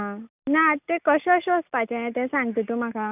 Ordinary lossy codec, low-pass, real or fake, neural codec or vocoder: none; 3.6 kHz; real; none